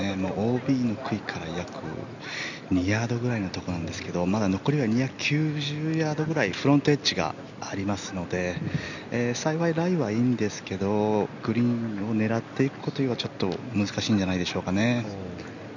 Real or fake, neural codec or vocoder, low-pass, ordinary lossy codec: real; none; 7.2 kHz; none